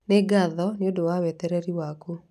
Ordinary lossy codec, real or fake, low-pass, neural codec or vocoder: none; real; 14.4 kHz; none